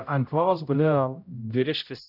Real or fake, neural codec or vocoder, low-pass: fake; codec, 16 kHz, 0.5 kbps, X-Codec, HuBERT features, trained on general audio; 5.4 kHz